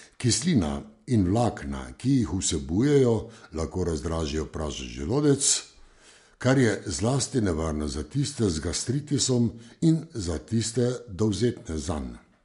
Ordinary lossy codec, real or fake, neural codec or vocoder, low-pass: MP3, 64 kbps; real; none; 10.8 kHz